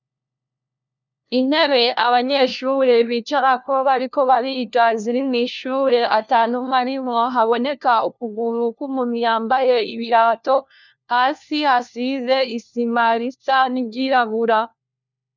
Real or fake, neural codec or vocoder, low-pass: fake; codec, 16 kHz, 1 kbps, FunCodec, trained on LibriTTS, 50 frames a second; 7.2 kHz